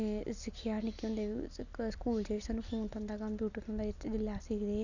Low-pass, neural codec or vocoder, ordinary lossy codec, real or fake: 7.2 kHz; none; none; real